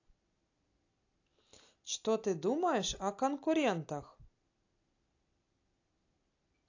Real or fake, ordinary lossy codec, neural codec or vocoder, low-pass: real; AAC, 48 kbps; none; 7.2 kHz